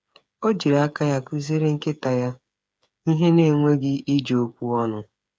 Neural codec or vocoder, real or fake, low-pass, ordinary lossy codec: codec, 16 kHz, 8 kbps, FreqCodec, smaller model; fake; none; none